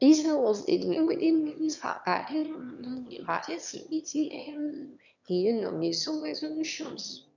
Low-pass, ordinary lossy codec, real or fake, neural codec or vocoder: 7.2 kHz; none; fake; autoencoder, 22.05 kHz, a latent of 192 numbers a frame, VITS, trained on one speaker